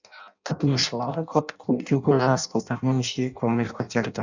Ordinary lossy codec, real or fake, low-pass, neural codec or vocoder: none; fake; 7.2 kHz; codec, 16 kHz in and 24 kHz out, 0.6 kbps, FireRedTTS-2 codec